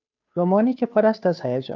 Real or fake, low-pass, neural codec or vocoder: fake; 7.2 kHz; codec, 16 kHz, 2 kbps, FunCodec, trained on Chinese and English, 25 frames a second